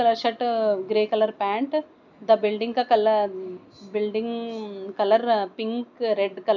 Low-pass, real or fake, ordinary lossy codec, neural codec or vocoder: 7.2 kHz; real; none; none